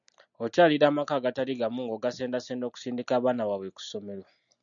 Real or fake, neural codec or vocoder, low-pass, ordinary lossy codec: real; none; 7.2 kHz; MP3, 96 kbps